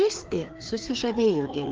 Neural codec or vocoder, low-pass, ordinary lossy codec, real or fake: codec, 16 kHz, 2 kbps, FreqCodec, larger model; 7.2 kHz; Opus, 16 kbps; fake